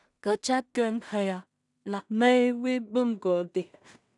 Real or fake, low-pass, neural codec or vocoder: fake; 10.8 kHz; codec, 16 kHz in and 24 kHz out, 0.4 kbps, LongCat-Audio-Codec, two codebook decoder